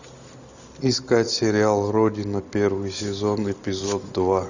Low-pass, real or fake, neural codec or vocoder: 7.2 kHz; real; none